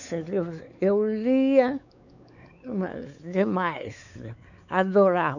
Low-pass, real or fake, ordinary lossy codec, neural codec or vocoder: 7.2 kHz; fake; none; codec, 16 kHz, 4 kbps, X-Codec, WavLM features, trained on Multilingual LibriSpeech